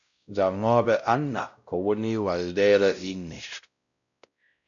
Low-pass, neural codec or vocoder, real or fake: 7.2 kHz; codec, 16 kHz, 0.5 kbps, X-Codec, WavLM features, trained on Multilingual LibriSpeech; fake